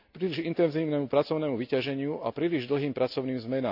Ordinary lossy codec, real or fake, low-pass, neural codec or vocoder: AAC, 48 kbps; real; 5.4 kHz; none